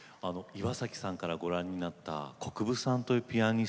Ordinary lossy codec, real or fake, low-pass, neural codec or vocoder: none; real; none; none